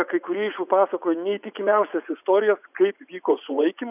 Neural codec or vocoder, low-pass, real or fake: vocoder, 44.1 kHz, 80 mel bands, Vocos; 3.6 kHz; fake